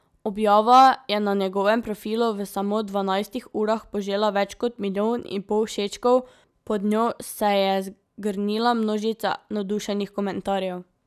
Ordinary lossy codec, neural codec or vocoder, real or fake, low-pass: none; none; real; 14.4 kHz